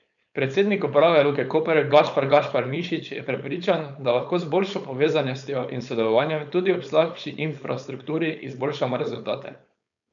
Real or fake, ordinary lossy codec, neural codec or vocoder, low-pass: fake; none; codec, 16 kHz, 4.8 kbps, FACodec; 7.2 kHz